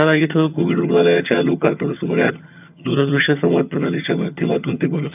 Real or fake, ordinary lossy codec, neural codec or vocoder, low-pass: fake; none; vocoder, 22.05 kHz, 80 mel bands, HiFi-GAN; 3.6 kHz